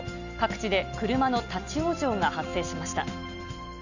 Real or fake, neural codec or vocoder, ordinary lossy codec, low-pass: real; none; none; 7.2 kHz